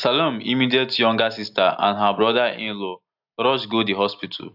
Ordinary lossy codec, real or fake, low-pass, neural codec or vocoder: AAC, 48 kbps; real; 5.4 kHz; none